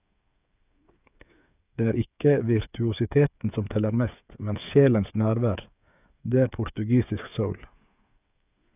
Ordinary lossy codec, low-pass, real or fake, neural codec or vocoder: none; 3.6 kHz; fake; codec, 16 kHz, 8 kbps, FreqCodec, smaller model